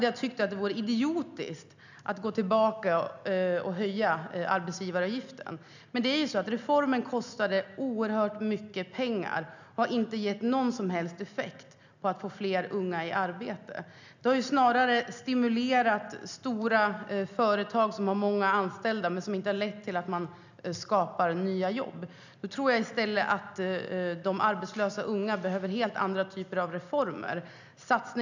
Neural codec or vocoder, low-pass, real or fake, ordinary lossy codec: none; 7.2 kHz; real; none